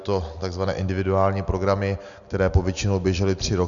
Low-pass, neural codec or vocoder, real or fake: 7.2 kHz; none; real